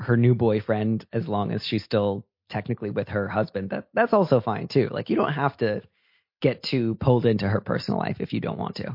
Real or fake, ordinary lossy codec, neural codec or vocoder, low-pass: real; MP3, 32 kbps; none; 5.4 kHz